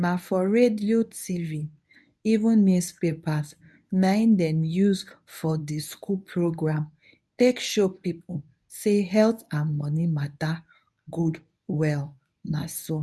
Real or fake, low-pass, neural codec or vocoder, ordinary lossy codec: fake; none; codec, 24 kHz, 0.9 kbps, WavTokenizer, medium speech release version 1; none